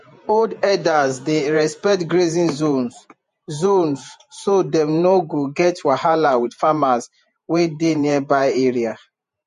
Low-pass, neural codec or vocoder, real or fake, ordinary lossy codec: 14.4 kHz; vocoder, 48 kHz, 128 mel bands, Vocos; fake; MP3, 48 kbps